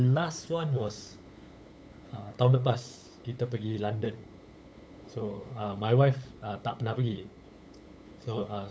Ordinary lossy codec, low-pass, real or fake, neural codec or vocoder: none; none; fake; codec, 16 kHz, 8 kbps, FunCodec, trained on LibriTTS, 25 frames a second